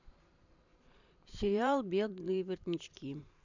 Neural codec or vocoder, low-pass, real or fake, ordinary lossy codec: vocoder, 44.1 kHz, 128 mel bands every 512 samples, BigVGAN v2; 7.2 kHz; fake; none